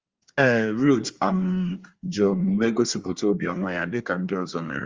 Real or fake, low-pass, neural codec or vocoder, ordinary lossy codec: fake; 7.2 kHz; codec, 24 kHz, 1 kbps, SNAC; Opus, 32 kbps